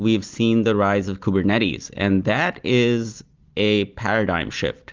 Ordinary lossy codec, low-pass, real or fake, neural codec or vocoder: Opus, 24 kbps; 7.2 kHz; real; none